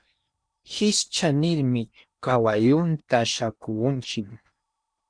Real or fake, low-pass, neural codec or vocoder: fake; 9.9 kHz; codec, 16 kHz in and 24 kHz out, 0.8 kbps, FocalCodec, streaming, 65536 codes